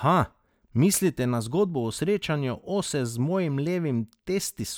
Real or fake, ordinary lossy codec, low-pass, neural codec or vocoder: real; none; none; none